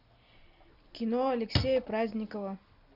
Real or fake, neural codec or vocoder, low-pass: real; none; 5.4 kHz